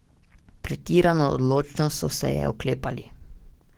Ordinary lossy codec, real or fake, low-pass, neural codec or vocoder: Opus, 16 kbps; fake; 19.8 kHz; codec, 44.1 kHz, 7.8 kbps, DAC